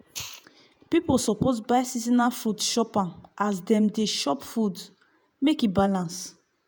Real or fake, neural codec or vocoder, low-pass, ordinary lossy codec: fake; vocoder, 48 kHz, 128 mel bands, Vocos; none; none